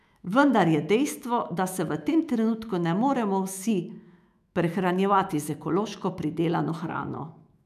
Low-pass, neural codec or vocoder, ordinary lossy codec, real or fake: 14.4 kHz; autoencoder, 48 kHz, 128 numbers a frame, DAC-VAE, trained on Japanese speech; none; fake